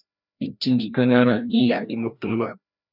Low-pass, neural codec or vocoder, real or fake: 5.4 kHz; codec, 16 kHz, 1 kbps, FreqCodec, larger model; fake